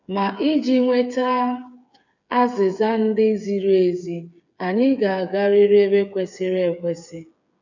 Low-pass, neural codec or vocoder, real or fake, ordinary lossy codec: 7.2 kHz; codec, 16 kHz, 8 kbps, FreqCodec, smaller model; fake; none